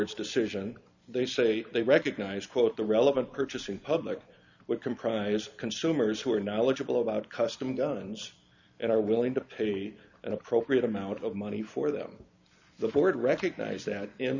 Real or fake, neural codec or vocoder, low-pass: real; none; 7.2 kHz